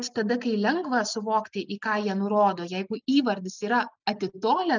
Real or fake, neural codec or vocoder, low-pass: real; none; 7.2 kHz